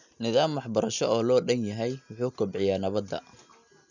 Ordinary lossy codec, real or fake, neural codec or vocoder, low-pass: none; real; none; 7.2 kHz